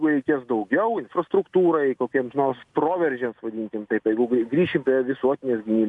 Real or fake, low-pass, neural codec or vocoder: real; 10.8 kHz; none